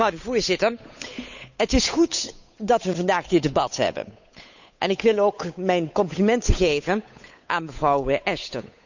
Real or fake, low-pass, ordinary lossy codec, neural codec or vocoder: fake; 7.2 kHz; none; codec, 16 kHz, 8 kbps, FunCodec, trained on Chinese and English, 25 frames a second